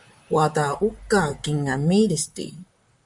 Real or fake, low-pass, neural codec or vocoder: fake; 10.8 kHz; vocoder, 44.1 kHz, 128 mel bands, Pupu-Vocoder